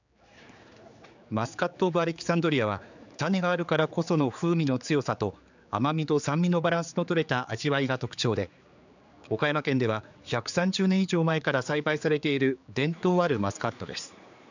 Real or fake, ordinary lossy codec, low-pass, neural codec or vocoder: fake; none; 7.2 kHz; codec, 16 kHz, 4 kbps, X-Codec, HuBERT features, trained on general audio